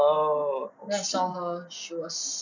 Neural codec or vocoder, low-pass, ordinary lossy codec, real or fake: none; 7.2 kHz; none; real